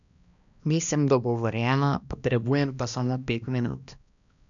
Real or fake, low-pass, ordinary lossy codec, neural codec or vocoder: fake; 7.2 kHz; none; codec, 16 kHz, 1 kbps, X-Codec, HuBERT features, trained on balanced general audio